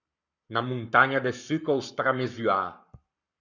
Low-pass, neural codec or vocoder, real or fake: 7.2 kHz; codec, 44.1 kHz, 7.8 kbps, Pupu-Codec; fake